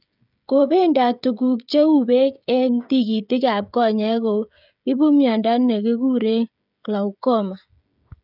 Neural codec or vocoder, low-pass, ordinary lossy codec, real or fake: codec, 16 kHz, 16 kbps, FreqCodec, smaller model; 5.4 kHz; none; fake